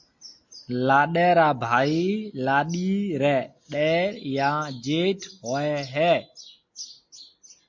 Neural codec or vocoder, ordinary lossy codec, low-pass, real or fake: none; MP3, 48 kbps; 7.2 kHz; real